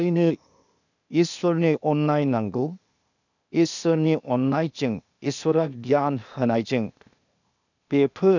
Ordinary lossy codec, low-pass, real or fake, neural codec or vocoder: none; 7.2 kHz; fake; codec, 16 kHz, 0.8 kbps, ZipCodec